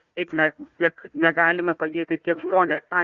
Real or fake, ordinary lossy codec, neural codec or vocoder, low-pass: fake; Opus, 64 kbps; codec, 16 kHz, 1 kbps, FunCodec, trained on Chinese and English, 50 frames a second; 7.2 kHz